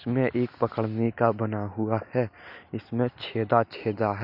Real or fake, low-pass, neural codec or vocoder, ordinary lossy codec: real; 5.4 kHz; none; none